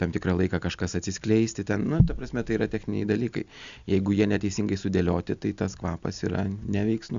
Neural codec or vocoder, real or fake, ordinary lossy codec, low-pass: none; real; Opus, 64 kbps; 7.2 kHz